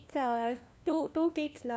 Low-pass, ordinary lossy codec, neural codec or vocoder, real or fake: none; none; codec, 16 kHz, 1 kbps, FunCodec, trained on LibriTTS, 50 frames a second; fake